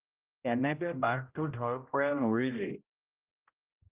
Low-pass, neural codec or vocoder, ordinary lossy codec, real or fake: 3.6 kHz; codec, 16 kHz, 0.5 kbps, X-Codec, HuBERT features, trained on general audio; Opus, 24 kbps; fake